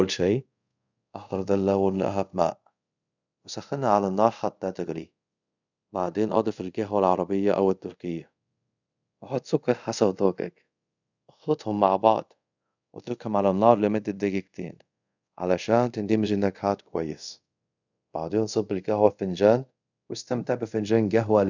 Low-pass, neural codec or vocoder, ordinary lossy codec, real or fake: 7.2 kHz; codec, 24 kHz, 0.5 kbps, DualCodec; none; fake